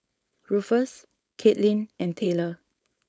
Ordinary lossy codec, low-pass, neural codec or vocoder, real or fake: none; none; codec, 16 kHz, 4.8 kbps, FACodec; fake